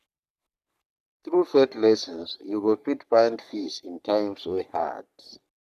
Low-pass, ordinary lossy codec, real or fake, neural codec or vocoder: 14.4 kHz; none; fake; codec, 44.1 kHz, 3.4 kbps, Pupu-Codec